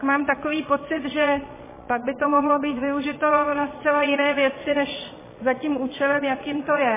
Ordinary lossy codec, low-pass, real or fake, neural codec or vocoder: MP3, 16 kbps; 3.6 kHz; fake; vocoder, 22.05 kHz, 80 mel bands, Vocos